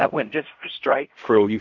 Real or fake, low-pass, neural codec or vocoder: fake; 7.2 kHz; codec, 16 kHz in and 24 kHz out, 0.4 kbps, LongCat-Audio-Codec, fine tuned four codebook decoder